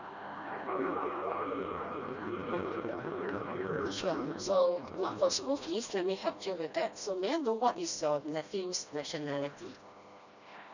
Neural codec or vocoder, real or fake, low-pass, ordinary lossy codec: codec, 16 kHz, 1 kbps, FreqCodec, smaller model; fake; 7.2 kHz; none